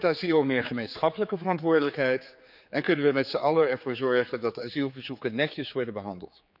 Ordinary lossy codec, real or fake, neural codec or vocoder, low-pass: none; fake; codec, 16 kHz, 4 kbps, X-Codec, HuBERT features, trained on general audio; 5.4 kHz